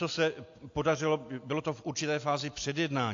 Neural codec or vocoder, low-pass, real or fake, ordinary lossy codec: none; 7.2 kHz; real; AAC, 48 kbps